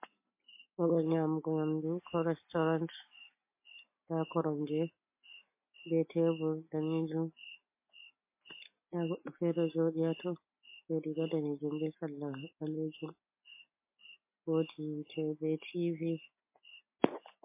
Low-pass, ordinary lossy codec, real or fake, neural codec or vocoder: 3.6 kHz; MP3, 24 kbps; real; none